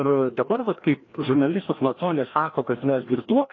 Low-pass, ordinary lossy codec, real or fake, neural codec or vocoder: 7.2 kHz; AAC, 32 kbps; fake; codec, 16 kHz, 1 kbps, FreqCodec, larger model